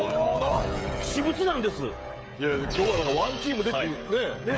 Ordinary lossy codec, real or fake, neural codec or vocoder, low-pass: none; fake; codec, 16 kHz, 16 kbps, FreqCodec, smaller model; none